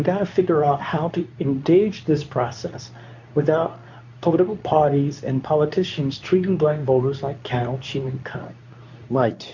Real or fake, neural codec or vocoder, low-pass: fake; codec, 24 kHz, 0.9 kbps, WavTokenizer, medium speech release version 2; 7.2 kHz